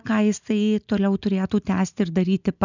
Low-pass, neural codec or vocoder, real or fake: 7.2 kHz; none; real